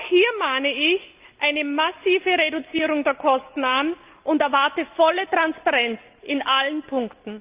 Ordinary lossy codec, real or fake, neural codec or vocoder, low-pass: Opus, 32 kbps; real; none; 3.6 kHz